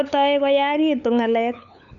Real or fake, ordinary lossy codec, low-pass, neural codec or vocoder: fake; none; 7.2 kHz; codec, 16 kHz, 8 kbps, FunCodec, trained on LibriTTS, 25 frames a second